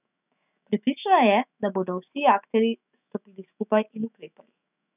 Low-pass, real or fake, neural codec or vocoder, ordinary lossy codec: 3.6 kHz; fake; autoencoder, 48 kHz, 128 numbers a frame, DAC-VAE, trained on Japanese speech; none